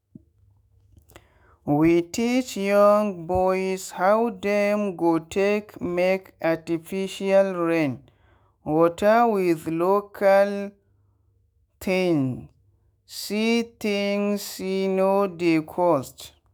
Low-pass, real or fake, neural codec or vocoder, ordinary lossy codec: none; fake; autoencoder, 48 kHz, 128 numbers a frame, DAC-VAE, trained on Japanese speech; none